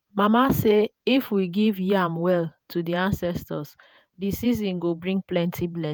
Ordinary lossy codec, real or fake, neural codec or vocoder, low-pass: none; fake; vocoder, 48 kHz, 128 mel bands, Vocos; none